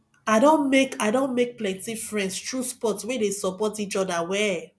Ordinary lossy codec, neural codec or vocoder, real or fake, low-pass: none; none; real; none